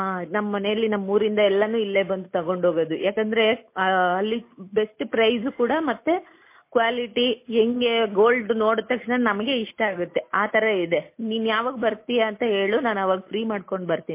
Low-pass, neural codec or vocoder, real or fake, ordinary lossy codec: 3.6 kHz; none; real; MP3, 24 kbps